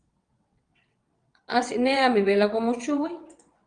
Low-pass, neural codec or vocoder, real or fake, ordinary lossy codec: 9.9 kHz; vocoder, 22.05 kHz, 80 mel bands, WaveNeXt; fake; Opus, 32 kbps